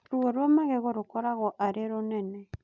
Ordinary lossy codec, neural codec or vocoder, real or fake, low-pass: none; none; real; 7.2 kHz